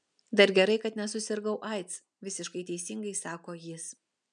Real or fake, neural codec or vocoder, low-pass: real; none; 9.9 kHz